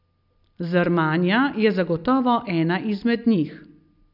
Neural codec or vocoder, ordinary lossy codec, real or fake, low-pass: none; none; real; 5.4 kHz